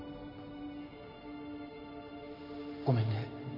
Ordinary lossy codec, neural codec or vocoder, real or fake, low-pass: AAC, 32 kbps; none; real; 5.4 kHz